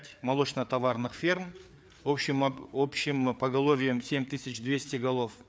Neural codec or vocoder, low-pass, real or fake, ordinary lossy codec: codec, 16 kHz, 4 kbps, FreqCodec, larger model; none; fake; none